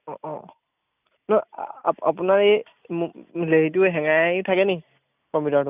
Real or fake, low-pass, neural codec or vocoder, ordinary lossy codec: real; 3.6 kHz; none; none